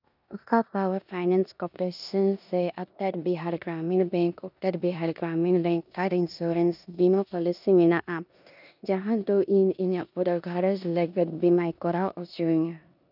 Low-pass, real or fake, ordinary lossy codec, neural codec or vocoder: 5.4 kHz; fake; none; codec, 16 kHz in and 24 kHz out, 0.9 kbps, LongCat-Audio-Codec, four codebook decoder